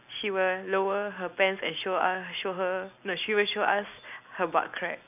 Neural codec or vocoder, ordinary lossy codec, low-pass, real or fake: none; none; 3.6 kHz; real